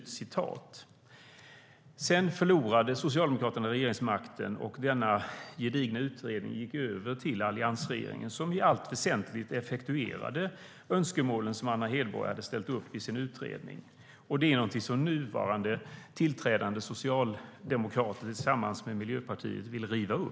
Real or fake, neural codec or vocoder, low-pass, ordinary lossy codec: real; none; none; none